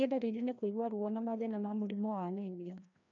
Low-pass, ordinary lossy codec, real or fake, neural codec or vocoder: 7.2 kHz; none; fake; codec, 16 kHz, 1 kbps, FreqCodec, larger model